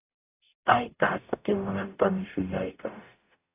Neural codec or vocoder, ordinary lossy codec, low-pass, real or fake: codec, 44.1 kHz, 0.9 kbps, DAC; AAC, 24 kbps; 3.6 kHz; fake